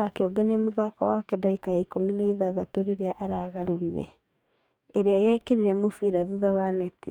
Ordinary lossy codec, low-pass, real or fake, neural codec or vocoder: none; 19.8 kHz; fake; codec, 44.1 kHz, 2.6 kbps, DAC